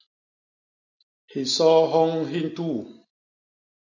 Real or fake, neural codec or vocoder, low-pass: real; none; 7.2 kHz